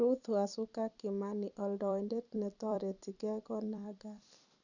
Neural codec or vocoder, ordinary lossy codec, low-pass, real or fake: vocoder, 44.1 kHz, 128 mel bands every 256 samples, BigVGAN v2; none; 7.2 kHz; fake